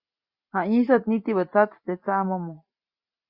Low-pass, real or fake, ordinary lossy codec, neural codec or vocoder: 5.4 kHz; real; Opus, 64 kbps; none